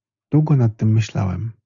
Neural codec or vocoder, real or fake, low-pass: none; real; 7.2 kHz